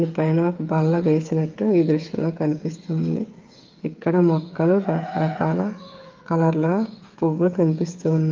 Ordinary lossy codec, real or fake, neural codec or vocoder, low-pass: Opus, 32 kbps; fake; codec, 16 kHz, 8 kbps, FreqCodec, smaller model; 7.2 kHz